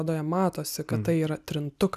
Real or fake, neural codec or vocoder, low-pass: real; none; 14.4 kHz